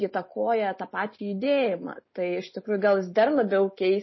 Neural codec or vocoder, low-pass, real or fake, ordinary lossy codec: none; 7.2 kHz; real; MP3, 24 kbps